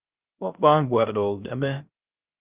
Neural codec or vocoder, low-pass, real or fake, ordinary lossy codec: codec, 16 kHz, 0.3 kbps, FocalCodec; 3.6 kHz; fake; Opus, 32 kbps